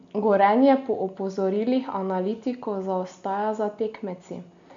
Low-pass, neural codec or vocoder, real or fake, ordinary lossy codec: 7.2 kHz; none; real; MP3, 64 kbps